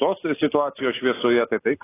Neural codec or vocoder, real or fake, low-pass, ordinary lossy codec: none; real; 3.6 kHz; AAC, 16 kbps